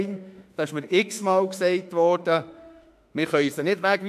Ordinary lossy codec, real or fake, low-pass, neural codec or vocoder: AAC, 96 kbps; fake; 14.4 kHz; autoencoder, 48 kHz, 32 numbers a frame, DAC-VAE, trained on Japanese speech